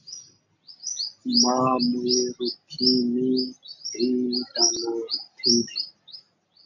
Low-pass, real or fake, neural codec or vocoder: 7.2 kHz; real; none